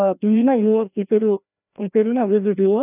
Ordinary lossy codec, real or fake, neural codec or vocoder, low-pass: none; fake; codec, 16 kHz, 1 kbps, FreqCodec, larger model; 3.6 kHz